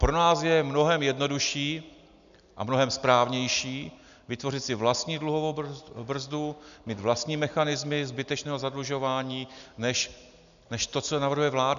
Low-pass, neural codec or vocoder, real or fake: 7.2 kHz; none; real